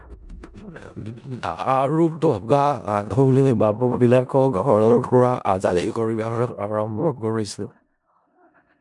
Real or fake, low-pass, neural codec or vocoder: fake; 10.8 kHz; codec, 16 kHz in and 24 kHz out, 0.4 kbps, LongCat-Audio-Codec, four codebook decoder